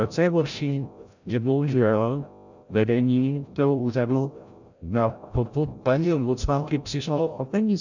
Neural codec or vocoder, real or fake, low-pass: codec, 16 kHz, 0.5 kbps, FreqCodec, larger model; fake; 7.2 kHz